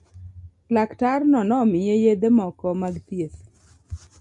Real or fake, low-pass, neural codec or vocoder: real; 10.8 kHz; none